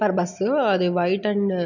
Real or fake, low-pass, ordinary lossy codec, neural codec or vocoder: real; 7.2 kHz; none; none